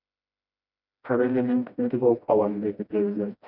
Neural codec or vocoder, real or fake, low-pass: codec, 16 kHz, 1 kbps, FreqCodec, smaller model; fake; 5.4 kHz